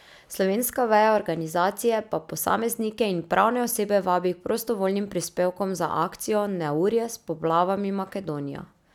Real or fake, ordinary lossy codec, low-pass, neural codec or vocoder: real; none; 19.8 kHz; none